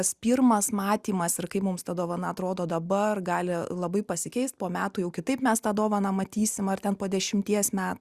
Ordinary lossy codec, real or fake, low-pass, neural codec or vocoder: Opus, 64 kbps; real; 14.4 kHz; none